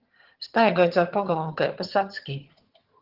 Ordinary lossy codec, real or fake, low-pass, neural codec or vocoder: Opus, 16 kbps; fake; 5.4 kHz; vocoder, 22.05 kHz, 80 mel bands, HiFi-GAN